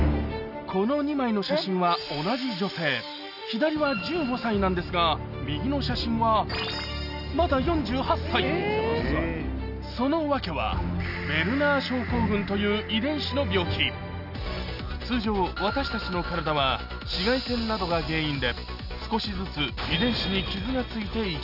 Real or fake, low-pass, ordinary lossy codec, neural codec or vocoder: real; 5.4 kHz; none; none